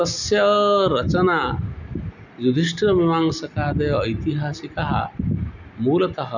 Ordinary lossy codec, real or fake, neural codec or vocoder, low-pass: none; real; none; 7.2 kHz